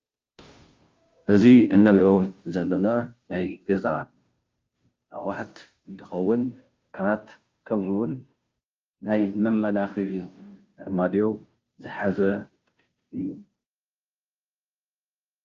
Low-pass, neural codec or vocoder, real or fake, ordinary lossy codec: 7.2 kHz; codec, 16 kHz, 0.5 kbps, FunCodec, trained on Chinese and English, 25 frames a second; fake; Opus, 24 kbps